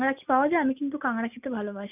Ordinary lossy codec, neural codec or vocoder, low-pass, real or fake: none; none; 3.6 kHz; real